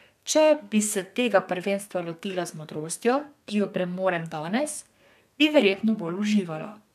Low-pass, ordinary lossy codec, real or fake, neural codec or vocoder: 14.4 kHz; none; fake; codec, 32 kHz, 1.9 kbps, SNAC